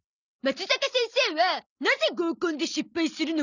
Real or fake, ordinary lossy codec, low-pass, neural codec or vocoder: real; none; 7.2 kHz; none